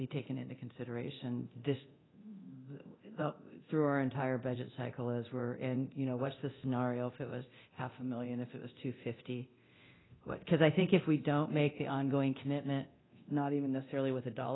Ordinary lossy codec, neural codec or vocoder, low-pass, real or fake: AAC, 16 kbps; codec, 24 kHz, 0.9 kbps, DualCodec; 7.2 kHz; fake